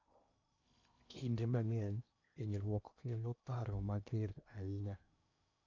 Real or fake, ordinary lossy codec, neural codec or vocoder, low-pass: fake; none; codec, 16 kHz in and 24 kHz out, 0.8 kbps, FocalCodec, streaming, 65536 codes; 7.2 kHz